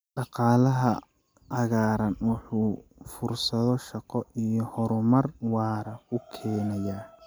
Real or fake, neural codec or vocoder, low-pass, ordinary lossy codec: real; none; none; none